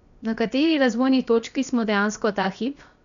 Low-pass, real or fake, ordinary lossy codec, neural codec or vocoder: 7.2 kHz; fake; none; codec, 16 kHz, 0.7 kbps, FocalCodec